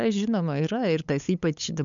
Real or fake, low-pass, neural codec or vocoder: fake; 7.2 kHz; codec, 16 kHz, 8 kbps, FunCodec, trained on LibriTTS, 25 frames a second